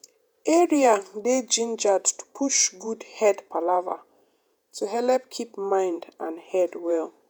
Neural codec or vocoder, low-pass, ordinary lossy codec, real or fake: vocoder, 48 kHz, 128 mel bands, Vocos; none; none; fake